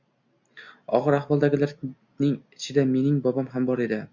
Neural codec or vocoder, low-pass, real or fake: none; 7.2 kHz; real